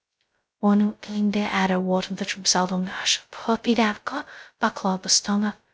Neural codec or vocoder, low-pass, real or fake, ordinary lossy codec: codec, 16 kHz, 0.2 kbps, FocalCodec; none; fake; none